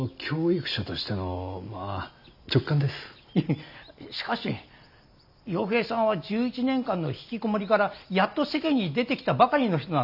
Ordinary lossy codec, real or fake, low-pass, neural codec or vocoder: none; real; 5.4 kHz; none